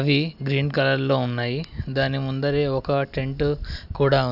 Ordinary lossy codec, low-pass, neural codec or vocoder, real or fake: none; 5.4 kHz; none; real